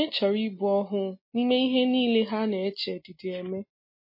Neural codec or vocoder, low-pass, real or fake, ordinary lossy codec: none; 5.4 kHz; real; MP3, 24 kbps